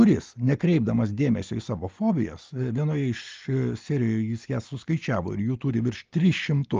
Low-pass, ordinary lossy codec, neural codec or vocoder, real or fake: 7.2 kHz; Opus, 24 kbps; none; real